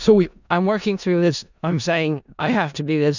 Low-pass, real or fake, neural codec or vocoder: 7.2 kHz; fake; codec, 16 kHz in and 24 kHz out, 0.4 kbps, LongCat-Audio-Codec, four codebook decoder